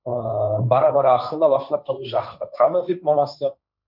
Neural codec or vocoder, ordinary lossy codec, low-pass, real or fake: codec, 16 kHz, 1.1 kbps, Voila-Tokenizer; none; 5.4 kHz; fake